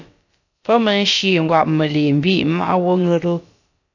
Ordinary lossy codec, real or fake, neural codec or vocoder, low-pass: AAC, 48 kbps; fake; codec, 16 kHz, about 1 kbps, DyCAST, with the encoder's durations; 7.2 kHz